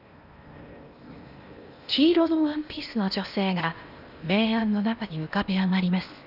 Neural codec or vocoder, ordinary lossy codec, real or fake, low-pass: codec, 16 kHz in and 24 kHz out, 0.8 kbps, FocalCodec, streaming, 65536 codes; none; fake; 5.4 kHz